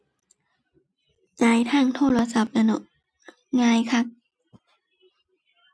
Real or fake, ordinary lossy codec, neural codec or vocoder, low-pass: real; none; none; none